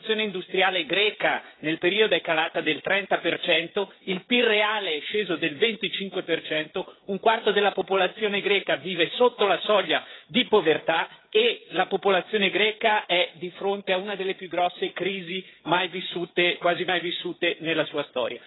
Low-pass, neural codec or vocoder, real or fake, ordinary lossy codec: 7.2 kHz; codec, 16 kHz, 16 kbps, FreqCodec, smaller model; fake; AAC, 16 kbps